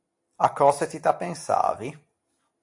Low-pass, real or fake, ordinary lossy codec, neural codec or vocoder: 10.8 kHz; real; AAC, 64 kbps; none